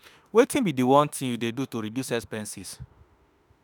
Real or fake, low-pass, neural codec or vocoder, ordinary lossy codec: fake; none; autoencoder, 48 kHz, 32 numbers a frame, DAC-VAE, trained on Japanese speech; none